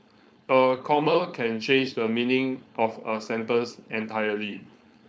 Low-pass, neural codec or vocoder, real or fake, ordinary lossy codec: none; codec, 16 kHz, 4.8 kbps, FACodec; fake; none